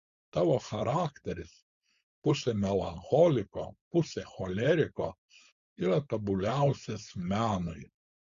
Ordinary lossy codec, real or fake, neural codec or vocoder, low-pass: Opus, 64 kbps; fake; codec, 16 kHz, 4.8 kbps, FACodec; 7.2 kHz